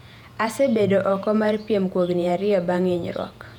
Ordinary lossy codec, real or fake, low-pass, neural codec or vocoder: none; fake; 19.8 kHz; vocoder, 48 kHz, 128 mel bands, Vocos